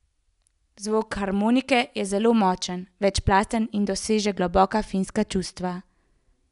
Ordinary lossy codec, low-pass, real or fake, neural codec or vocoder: none; 10.8 kHz; real; none